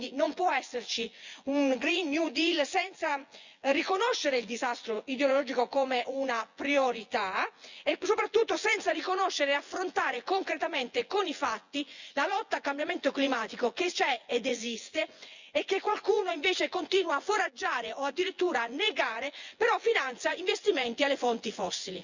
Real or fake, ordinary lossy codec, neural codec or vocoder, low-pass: fake; Opus, 64 kbps; vocoder, 24 kHz, 100 mel bands, Vocos; 7.2 kHz